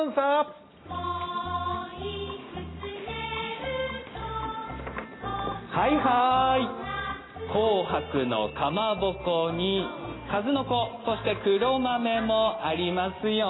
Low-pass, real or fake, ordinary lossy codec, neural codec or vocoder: 7.2 kHz; real; AAC, 16 kbps; none